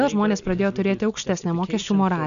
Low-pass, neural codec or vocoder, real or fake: 7.2 kHz; none; real